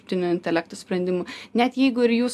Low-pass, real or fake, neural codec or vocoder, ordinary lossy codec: 14.4 kHz; real; none; AAC, 96 kbps